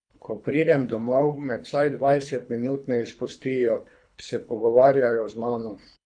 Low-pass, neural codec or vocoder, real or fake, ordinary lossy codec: 9.9 kHz; codec, 24 kHz, 3 kbps, HILCodec; fake; none